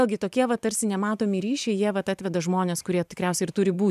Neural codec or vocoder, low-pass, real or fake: vocoder, 44.1 kHz, 128 mel bands every 512 samples, BigVGAN v2; 14.4 kHz; fake